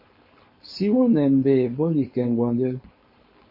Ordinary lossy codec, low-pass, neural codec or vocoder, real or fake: MP3, 24 kbps; 5.4 kHz; codec, 16 kHz, 4.8 kbps, FACodec; fake